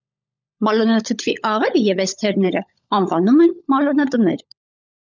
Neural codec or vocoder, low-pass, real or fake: codec, 16 kHz, 16 kbps, FunCodec, trained on LibriTTS, 50 frames a second; 7.2 kHz; fake